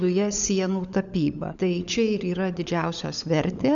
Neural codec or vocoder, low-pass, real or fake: codec, 16 kHz, 16 kbps, FunCodec, trained on LibriTTS, 50 frames a second; 7.2 kHz; fake